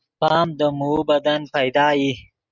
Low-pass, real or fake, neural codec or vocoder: 7.2 kHz; real; none